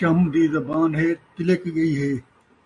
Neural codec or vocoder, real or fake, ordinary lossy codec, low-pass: none; real; MP3, 48 kbps; 10.8 kHz